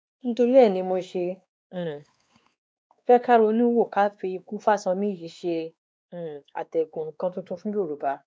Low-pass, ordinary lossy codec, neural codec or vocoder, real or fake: none; none; codec, 16 kHz, 2 kbps, X-Codec, WavLM features, trained on Multilingual LibriSpeech; fake